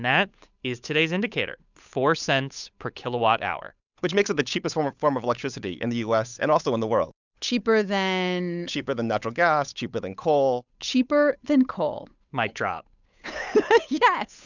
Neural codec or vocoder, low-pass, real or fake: codec, 16 kHz, 8 kbps, FunCodec, trained on Chinese and English, 25 frames a second; 7.2 kHz; fake